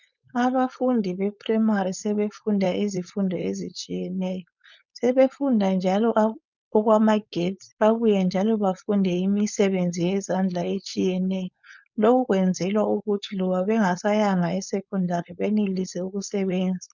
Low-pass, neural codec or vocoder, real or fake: 7.2 kHz; codec, 16 kHz, 4.8 kbps, FACodec; fake